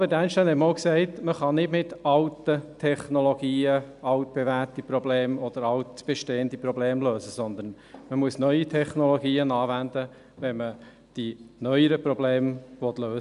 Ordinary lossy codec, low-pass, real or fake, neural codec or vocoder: none; 10.8 kHz; real; none